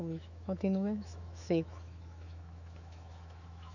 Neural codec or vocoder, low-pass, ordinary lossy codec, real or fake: autoencoder, 48 kHz, 128 numbers a frame, DAC-VAE, trained on Japanese speech; 7.2 kHz; none; fake